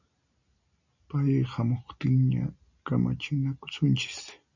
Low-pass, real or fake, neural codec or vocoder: 7.2 kHz; real; none